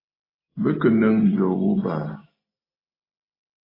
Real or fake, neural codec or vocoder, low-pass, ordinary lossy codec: real; none; 5.4 kHz; AAC, 24 kbps